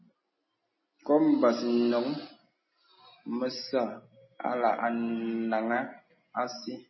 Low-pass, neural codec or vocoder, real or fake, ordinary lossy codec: 7.2 kHz; none; real; MP3, 24 kbps